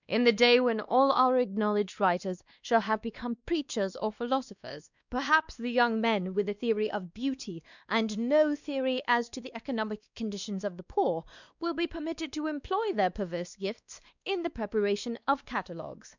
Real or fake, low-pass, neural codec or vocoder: fake; 7.2 kHz; codec, 16 kHz, 2 kbps, X-Codec, WavLM features, trained on Multilingual LibriSpeech